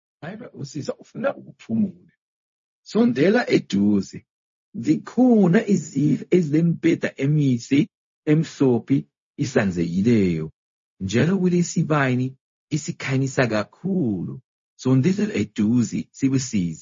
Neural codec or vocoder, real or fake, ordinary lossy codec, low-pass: codec, 16 kHz, 0.4 kbps, LongCat-Audio-Codec; fake; MP3, 32 kbps; 7.2 kHz